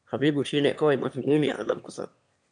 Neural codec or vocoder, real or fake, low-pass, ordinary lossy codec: autoencoder, 22.05 kHz, a latent of 192 numbers a frame, VITS, trained on one speaker; fake; 9.9 kHz; AAC, 64 kbps